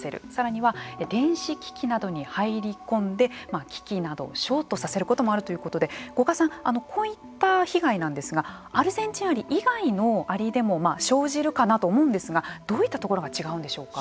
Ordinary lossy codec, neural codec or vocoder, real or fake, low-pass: none; none; real; none